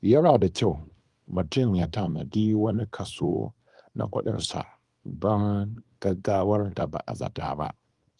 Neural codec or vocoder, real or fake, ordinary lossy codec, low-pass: codec, 24 kHz, 0.9 kbps, WavTokenizer, small release; fake; Opus, 32 kbps; 10.8 kHz